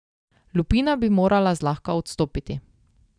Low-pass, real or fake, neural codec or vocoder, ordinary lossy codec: 9.9 kHz; real; none; none